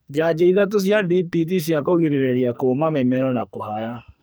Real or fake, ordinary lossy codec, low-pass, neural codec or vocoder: fake; none; none; codec, 44.1 kHz, 2.6 kbps, SNAC